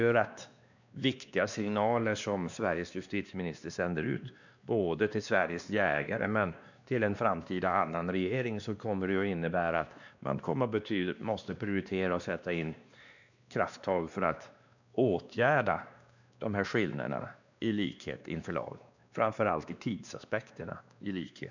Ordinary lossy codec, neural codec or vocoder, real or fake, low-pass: none; codec, 16 kHz, 2 kbps, X-Codec, WavLM features, trained on Multilingual LibriSpeech; fake; 7.2 kHz